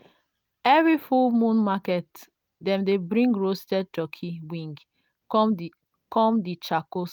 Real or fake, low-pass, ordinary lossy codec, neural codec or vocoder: real; 19.8 kHz; none; none